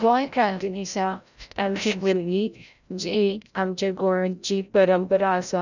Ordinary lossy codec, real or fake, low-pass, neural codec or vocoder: none; fake; 7.2 kHz; codec, 16 kHz, 0.5 kbps, FreqCodec, larger model